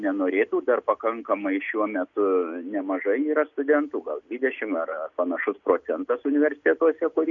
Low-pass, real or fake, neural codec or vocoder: 7.2 kHz; real; none